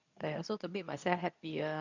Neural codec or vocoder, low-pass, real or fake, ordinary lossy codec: codec, 24 kHz, 0.9 kbps, WavTokenizer, medium speech release version 2; 7.2 kHz; fake; none